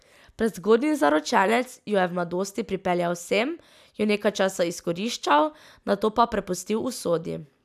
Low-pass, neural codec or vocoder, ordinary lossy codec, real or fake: 14.4 kHz; none; none; real